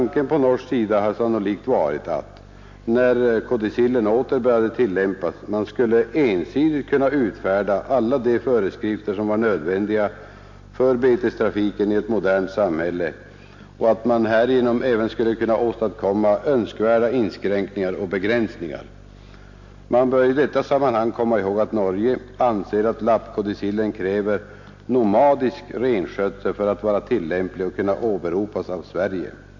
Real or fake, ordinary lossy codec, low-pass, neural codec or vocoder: real; MP3, 48 kbps; 7.2 kHz; none